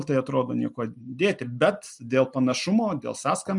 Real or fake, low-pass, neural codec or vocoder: real; 10.8 kHz; none